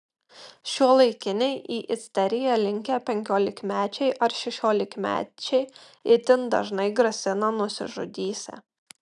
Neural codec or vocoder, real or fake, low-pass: none; real; 10.8 kHz